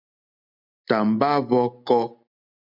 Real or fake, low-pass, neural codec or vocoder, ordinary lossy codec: real; 5.4 kHz; none; MP3, 48 kbps